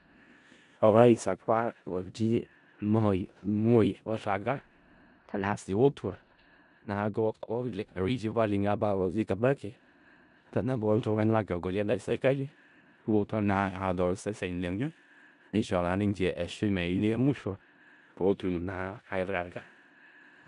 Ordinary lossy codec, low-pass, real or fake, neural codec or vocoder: none; 10.8 kHz; fake; codec, 16 kHz in and 24 kHz out, 0.4 kbps, LongCat-Audio-Codec, four codebook decoder